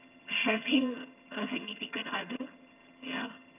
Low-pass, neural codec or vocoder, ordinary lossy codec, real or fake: 3.6 kHz; vocoder, 22.05 kHz, 80 mel bands, HiFi-GAN; none; fake